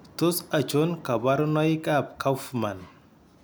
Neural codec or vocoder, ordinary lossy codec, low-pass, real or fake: none; none; none; real